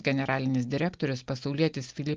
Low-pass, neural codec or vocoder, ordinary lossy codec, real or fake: 7.2 kHz; none; Opus, 32 kbps; real